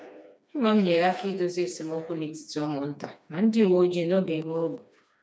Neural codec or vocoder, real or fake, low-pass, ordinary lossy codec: codec, 16 kHz, 2 kbps, FreqCodec, smaller model; fake; none; none